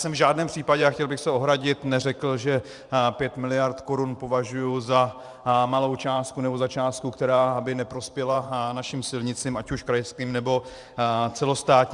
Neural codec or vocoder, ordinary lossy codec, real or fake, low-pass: vocoder, 44.1 kHz, 128 mel bands every 512 samples, BigVGAN v2; Opus, 64 kbps; fake; 10.8 kHz